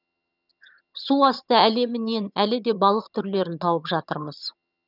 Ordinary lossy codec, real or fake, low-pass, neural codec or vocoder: none; fake; 5.4 kHz; vocoder, 22.05 kHz, 80 mel bands, HiFi-GAN